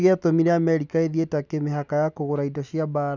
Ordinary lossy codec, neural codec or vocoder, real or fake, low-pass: none; none; real; 7.2 kHz